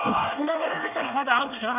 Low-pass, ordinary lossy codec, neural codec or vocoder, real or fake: 3.6 kHz; none; codec, 24 kHz, 1 kbps, SNAC; fake